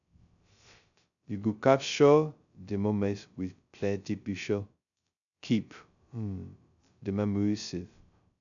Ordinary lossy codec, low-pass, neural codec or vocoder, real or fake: none; 7.2 kHz; codec, 16 kHz, 0.2 kbps, FocalCodec; fake